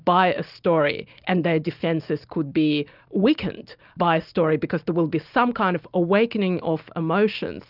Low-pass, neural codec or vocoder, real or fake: 5.4 kHz; none; real